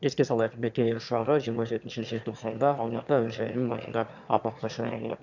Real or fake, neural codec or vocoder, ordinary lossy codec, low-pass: fake; autoencoder, 22.05 kHz, a latent of 192 numbers a frame, VITS, trained on one speaker; none; 7.2 kHz